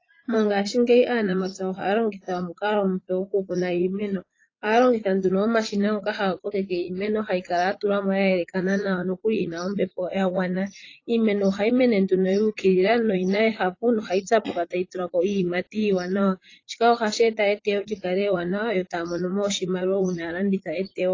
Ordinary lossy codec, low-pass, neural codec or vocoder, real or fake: AAC, 32 kbps; 7.2 kHz; vocoder, 44.1 kHz, 80 mel bands, Vocos; fake